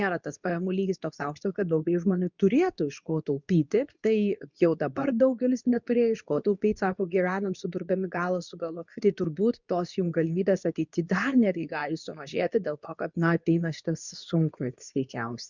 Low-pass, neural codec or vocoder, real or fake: 7.2 kHz; codec, 24 kHz, 0.9 kbps, WavTokenizer, medium speech release version 2; fake